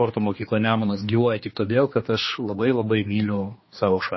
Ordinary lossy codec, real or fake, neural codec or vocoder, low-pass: MP3, 24 kbps; fake; codec, 16 kHz, 2 kbps, X-Codec, HuBERT features, trained on general audio; 7.2 kHz